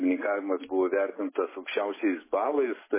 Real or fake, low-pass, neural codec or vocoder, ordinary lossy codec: real; 3.6 kHz; none; MP3, 16 kbps